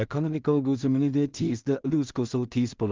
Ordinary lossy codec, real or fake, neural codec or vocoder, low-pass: Opus, 32 kbps; fake; codec, 16 kHz in and 24 kHz out, 0.4 kbps, LongCat-Audio-Codec, two codebook decoder; 7.2 kHz